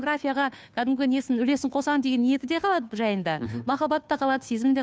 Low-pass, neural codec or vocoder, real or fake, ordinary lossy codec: none; codec, 16 kHz, 2 kbps, FunCodec, trained on Chinese and English, 25 frames a second; fake; none